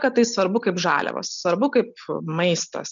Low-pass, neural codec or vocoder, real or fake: 7.2 kHz; none; real